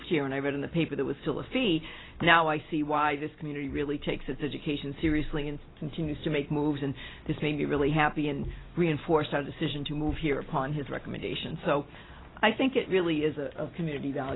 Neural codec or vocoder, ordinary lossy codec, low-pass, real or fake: none; AAC, 16 kbps; 7.2 kHz; real